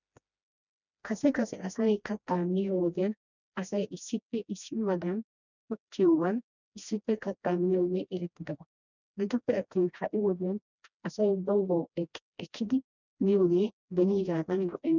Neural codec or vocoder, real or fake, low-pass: codec, 16 kHz, 1 kbps, FreqCodec, smaller model; fake; 7.2 kHz